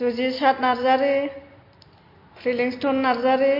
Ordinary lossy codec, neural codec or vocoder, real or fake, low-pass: MP3, 32 kbps; none; real; 5.4 kHz